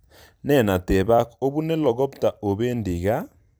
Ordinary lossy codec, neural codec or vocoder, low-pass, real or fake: none; vocoder, 44.1 kHz, 128 mel bands every 256 samples, BigVGAN v2; none; fake